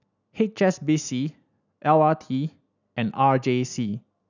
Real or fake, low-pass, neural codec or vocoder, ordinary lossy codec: real; 7.2 kHz; none; none